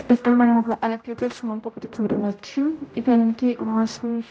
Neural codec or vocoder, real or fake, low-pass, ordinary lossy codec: codec, 16 kHz, 0.5 kbps, X-Codec, HuBERT features, trained on general audio; fake; none; none